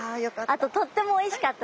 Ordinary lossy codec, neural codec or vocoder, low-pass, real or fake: none; none; none; real